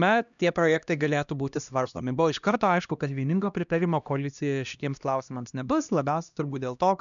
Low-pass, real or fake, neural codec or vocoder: 7.2 kHz; fake; codec, 16 kHz, 1 kbps, X-Codec, HuBERT features, trained on LibriSpeech